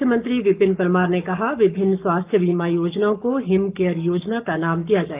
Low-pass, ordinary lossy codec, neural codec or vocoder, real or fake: 3.6 kHz; Opus, 24 kbps; codec, 44.1 kHz, 7.8 kbps, Pupu-Codec; fake